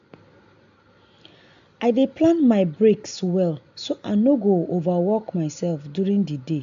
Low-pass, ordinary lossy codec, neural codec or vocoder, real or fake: 7.2 kHz; AAC, 64 kbps; none; real